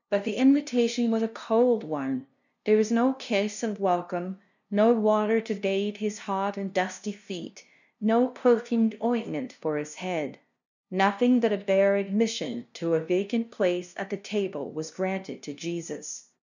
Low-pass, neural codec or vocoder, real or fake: 7.2 kHz; codec, 16 kHz, 0.5 kbps, FunCodec, trained on LibriTTS, 25 frames a second; fake